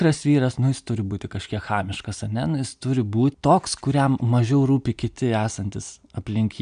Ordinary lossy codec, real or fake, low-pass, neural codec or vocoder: MP3, 96 kbps; real; 9.9 kHz; none